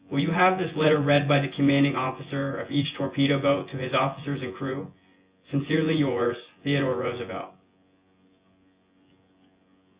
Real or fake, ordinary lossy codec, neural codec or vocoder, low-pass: fake; Opus, 64 kbps; vocoder, 24 kHz, 100 mel bands, Vocos; 3.6 kHz